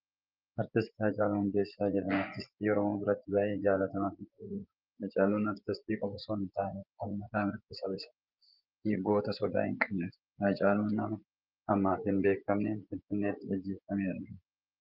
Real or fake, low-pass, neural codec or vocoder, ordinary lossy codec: fake; 5.4 kHz; vocoder, 24 kHz, 100 mel bands, Vocos; Opus, 32 kbps